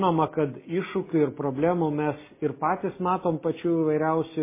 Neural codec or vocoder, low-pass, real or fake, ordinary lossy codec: none; 3.6 kHz; real; MP3, 16 kbps